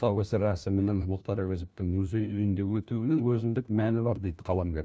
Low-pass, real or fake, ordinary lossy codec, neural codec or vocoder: none; fake; none; codec, 16 kHz, 1 kbps, FunCodec, trained on LibriTTS, 50 frames a second